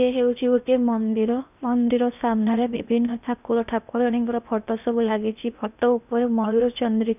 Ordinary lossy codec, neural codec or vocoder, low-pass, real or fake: none; codec, 16 kHz in and 24 kHz out, 0.8 kbps, FocalCodec, streaming, 65536 codes; 3.6 kHz; fake